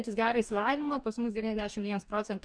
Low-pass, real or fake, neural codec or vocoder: 9.9 kHz; fake; codec, 44.1 kHz, 2.6 kbps, DAC